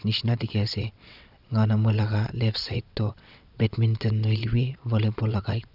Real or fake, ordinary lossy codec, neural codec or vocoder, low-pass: fake; none; vocoder, 22.05 kHz, 80 mel bands, WaveNeXt; 5.4 kHz